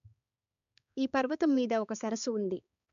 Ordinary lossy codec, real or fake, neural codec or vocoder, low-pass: MP3, 96 kbps; fake; codec, 16 kHz, 4 kbps, X-Codec, HuBERT features, trained on balanced general audio; 7.2 kHz